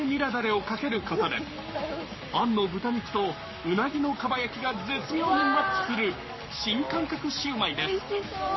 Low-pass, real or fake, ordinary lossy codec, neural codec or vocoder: 7.2 kHz; fake; MP3, 24 kbps; codec, 44.1 kHz, 7.8 kbps, Pupu-Codec